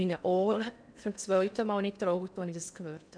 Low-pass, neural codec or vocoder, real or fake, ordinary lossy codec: 9.9 kHz; codec, 16 kHz in and 24 kHz out, 0.8 kbps, FocalCodec, streaming, 65536 codes; fake; none